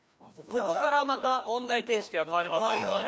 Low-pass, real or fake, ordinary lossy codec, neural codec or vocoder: none; fake; none; codec, 16 kHz, 1 kbps, FreqCodec, larger model